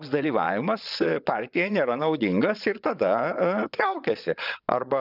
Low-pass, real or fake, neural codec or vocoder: 5.4 kHz; fake; vocoder, 22.05 kHz, 80 mel bands, WaveNeXt